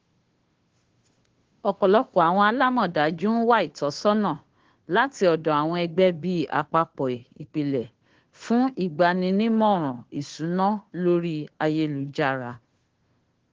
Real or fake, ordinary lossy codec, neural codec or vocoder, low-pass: fake; Opus, 16 kbps; codec, 16 kHz, 2 kbps, FunCodec, trained on Chinese and English, 25 frames a second; 7.2 kHz